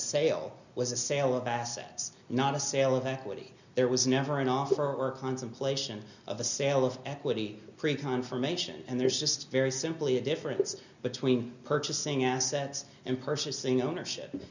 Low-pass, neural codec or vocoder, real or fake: 7.2 kHz; none; real